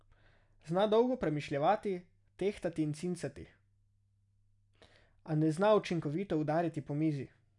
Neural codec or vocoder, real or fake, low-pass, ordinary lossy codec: none; real; 10.8 kHz; none